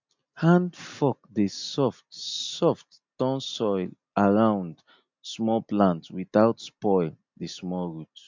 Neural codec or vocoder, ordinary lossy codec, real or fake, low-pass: none; MP3, 64 kbps; real; 7.2 kHz